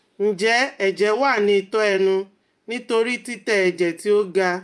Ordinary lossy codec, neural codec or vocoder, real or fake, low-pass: none; vocoder, 24 kHz, 100 mel bands, Vocos; fake; none